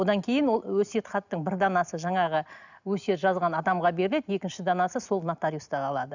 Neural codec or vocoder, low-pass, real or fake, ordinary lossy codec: none; 7.2 kHz; real; none